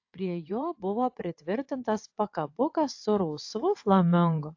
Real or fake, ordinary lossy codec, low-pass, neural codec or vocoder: fake; AAC, 48 kbps; 7.2 kHz; vocoder, 22.05 kHz, 80 mel bands, WaveNeXt